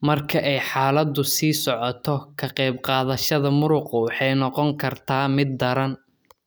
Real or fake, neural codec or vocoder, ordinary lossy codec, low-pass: real; none; none; none